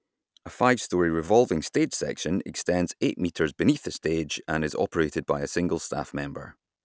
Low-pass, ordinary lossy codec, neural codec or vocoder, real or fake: none; none; none; real